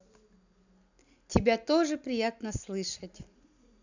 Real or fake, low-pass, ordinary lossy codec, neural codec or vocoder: real; 7.2 kHz; none; none